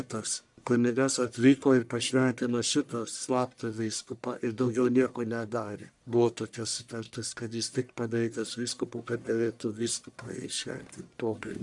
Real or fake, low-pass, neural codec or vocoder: fake; 10.8 kHz; codec, 44.1 kHz, 1.7 kbps, Pupu-Codec